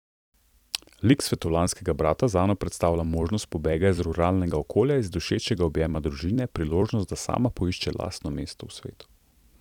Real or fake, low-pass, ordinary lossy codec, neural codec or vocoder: fake; 19.8 kHz; none; vocoder, 44.1 kHz, 128 mel bands every 256 samples, BigVGAN v2